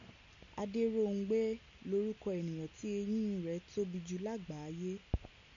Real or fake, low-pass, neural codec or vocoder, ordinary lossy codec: real; 7.2 kHz; none; MP3, 96 kbps